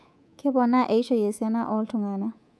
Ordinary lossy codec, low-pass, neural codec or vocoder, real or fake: none; none; codec, 24 kHz, 3.1 kbps, DualCodec; fake